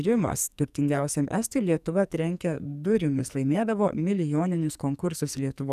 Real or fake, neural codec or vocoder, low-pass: fake; codec, 44.1 kHz, 2.6 kbps, SNAC; 14.4 kHz